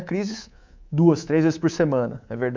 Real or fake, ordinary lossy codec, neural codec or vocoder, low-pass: fake; MP3, 64 kbps; codec, 24 kHz, 3.1 kbps, DualCodec; 7.2 kHz